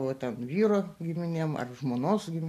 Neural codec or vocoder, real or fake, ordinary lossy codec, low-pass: none; real; AAC, 64 kbps; 14.4 kHz